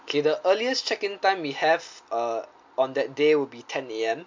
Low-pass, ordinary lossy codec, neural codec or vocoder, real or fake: 7.2 kHz; MP3, 48 kbps; none; real